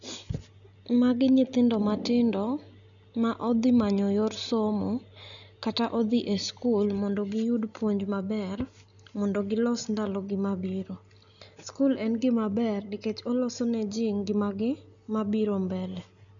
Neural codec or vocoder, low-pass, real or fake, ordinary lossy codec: none; 7.2 kHz; real; none